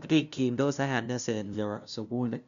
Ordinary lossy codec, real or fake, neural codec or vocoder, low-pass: none; fake; codec, 16 kHz, 0.5 kbps, FunCodec, trained on LibriTTS, 25 frames a second; 7.2 kHz